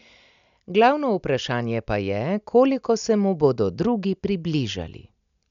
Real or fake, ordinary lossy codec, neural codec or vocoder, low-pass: real; none; none; 7.2 kHz